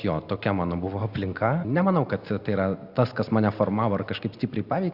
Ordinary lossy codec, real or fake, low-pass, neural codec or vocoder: Opus, 64 kbps; real; 5.4 kHz; none